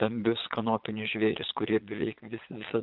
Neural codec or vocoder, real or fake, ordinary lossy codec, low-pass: vocoder, 22.05 kHz, 80 mel bands, Vocos; fake; Opus, 24 kbps; 5.4 kHz